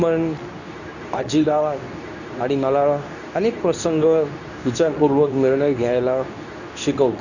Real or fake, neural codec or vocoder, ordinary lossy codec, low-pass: fake; codec, 24 kHz, 0.9 kbps, WavTokenizer, medium speech release version 2; none; 7.2 kHz